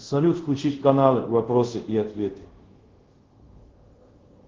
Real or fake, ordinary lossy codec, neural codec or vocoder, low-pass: fake; Opus, 16 kbps; codec, 24 kHz, 0.5 kbps, DualCodec; 7.2 kHz